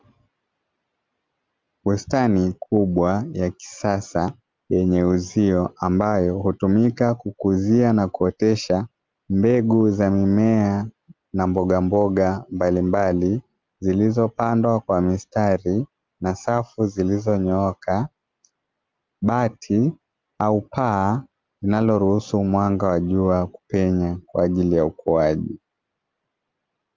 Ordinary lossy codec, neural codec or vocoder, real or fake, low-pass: Opus, 32 kbps; none; real; 7.2 kHz